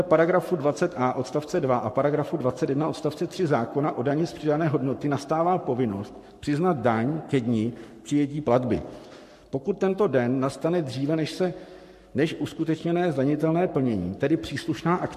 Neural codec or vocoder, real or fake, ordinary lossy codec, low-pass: codec, 44.1 kHz, 7.8 kbps, Pupu-Codec; fake; MP3, 64 kbps; 14.4 kHz